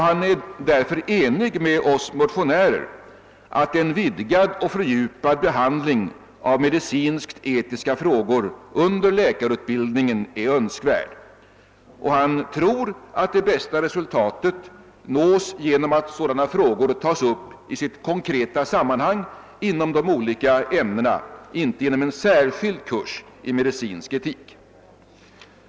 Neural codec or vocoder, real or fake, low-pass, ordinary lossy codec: none; real; none; none